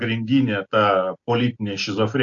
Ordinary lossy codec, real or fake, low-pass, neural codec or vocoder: AAC, 48 kbps; real; 7.2 kHz; none